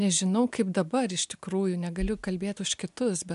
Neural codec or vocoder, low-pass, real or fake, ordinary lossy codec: none; 10.8 kHz; real; MP3, 96 kbps